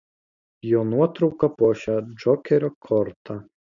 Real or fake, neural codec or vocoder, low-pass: real; none; 7.2 kHz